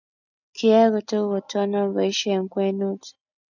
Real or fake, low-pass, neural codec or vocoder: real; 7.2 kHz; none